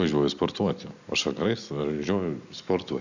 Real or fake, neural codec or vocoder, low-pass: real; none; 7.2 kHz